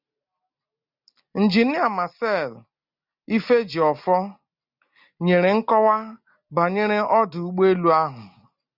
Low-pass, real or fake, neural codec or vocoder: 5.4 kHz; real; none